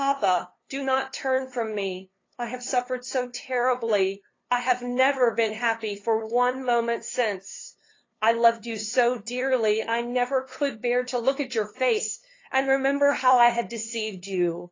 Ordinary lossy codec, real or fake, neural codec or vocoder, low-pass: AAC, 32 kbps; fake; codec, 16 kHz, 2 kbps, FunCodec, trained on LibriTTS, 25 frames a second; 7.2 kHz